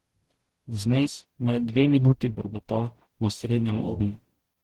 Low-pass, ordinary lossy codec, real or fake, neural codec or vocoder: 19.8 kHz; Opus, 16 kbps; fake; codec, 44.1 kHz, 0.9 kbps, DAC